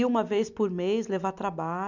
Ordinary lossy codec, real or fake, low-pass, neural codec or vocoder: none; real; 7.2 kHz; none